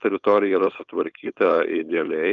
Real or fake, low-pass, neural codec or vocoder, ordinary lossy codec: fake; 7.2 kHz; codec, 16 kHz, 4.8 kbps, FACodec; Opus, 24 kbps